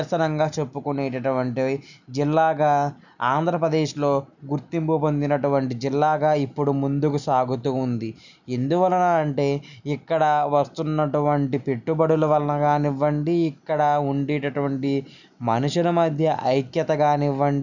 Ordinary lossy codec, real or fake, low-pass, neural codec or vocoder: none; real; 7.2 kHz; none